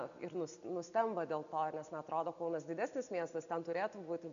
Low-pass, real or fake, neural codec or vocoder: 7.2 kHz; real; none